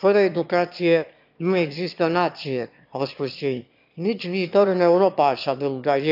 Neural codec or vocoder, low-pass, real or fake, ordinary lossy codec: autoencoder, 22.05 kHz, a latent of 192 numbers a frame, VITS, trained on one speaker; 5.4 kHz; fake; AAC, 48 kbps